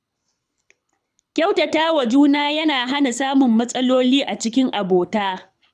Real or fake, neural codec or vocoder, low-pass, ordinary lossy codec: fake; codec, 24 kHz, 6 kbps, HILCodec; none; none